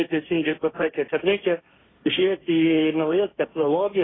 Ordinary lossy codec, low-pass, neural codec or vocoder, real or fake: AAC, 16 kbps; 7.2 kHz; codec, 16 kHz, 1.1 kbps, Voila-Tokenizer; fake